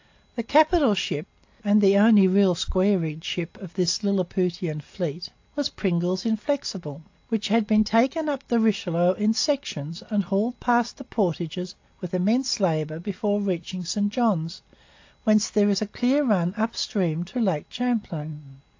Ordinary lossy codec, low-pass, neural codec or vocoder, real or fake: AAC, 48 kbps; 7.2 kHz; none; real